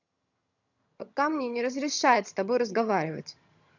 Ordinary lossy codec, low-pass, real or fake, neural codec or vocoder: none; 7.2 kHz; fake; vocoder, 22.05 kHz, 80 mel bands, HiFi-GAN